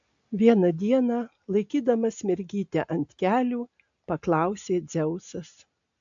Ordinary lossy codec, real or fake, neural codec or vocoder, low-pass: AAC, 64 kbps; real; none; 7.2 kHz